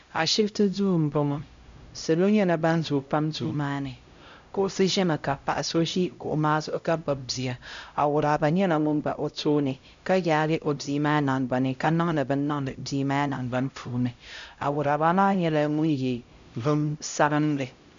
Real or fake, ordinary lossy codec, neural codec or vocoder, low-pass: fake; MP3, 64 kbps; codec, 16 kHz, 0.5 kbps, X-Codec, HuBERT features, trained on LibriSpeech; 7.2 kHz